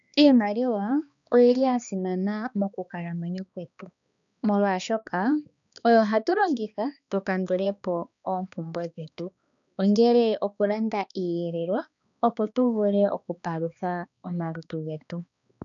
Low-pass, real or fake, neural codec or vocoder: 7.2 kHz; fake; codec, 16 kHz, 2 kbps, X-Codec, HuBERT features, trained on balanced general audio